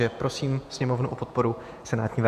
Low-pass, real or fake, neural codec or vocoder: 14.4 kHz; fake; vocoder, 48 kHz, 128 mel bands, Vocos